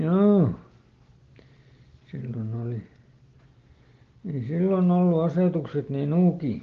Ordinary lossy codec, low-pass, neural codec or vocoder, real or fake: Opus, 16 kbps; 7.2 kHz; none; real